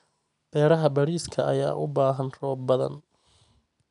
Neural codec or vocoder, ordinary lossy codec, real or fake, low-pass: none; none; real; 10.8 kHz